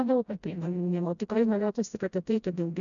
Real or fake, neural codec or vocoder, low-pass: fake; codec, 16 kHz, 0.5 kbps, FreqCodec, smaller model; 7.2 kHz